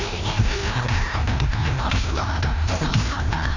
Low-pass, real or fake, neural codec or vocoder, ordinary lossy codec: 7.2 kHz; fake; codec, 16 kHz, 1 kbps, FreqCodec, larger model; none